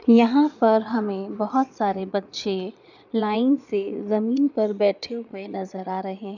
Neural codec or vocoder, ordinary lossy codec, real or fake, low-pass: vocoder, 22.05 kHz, 80 mel bands, Vocos; none; fake; 7.2 kHz